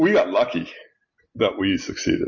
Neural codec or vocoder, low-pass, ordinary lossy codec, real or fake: none; 7.2 kHz; MP3, 32 kbps; real